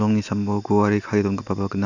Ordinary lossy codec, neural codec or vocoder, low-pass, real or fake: none; none; 7.2 kHz; real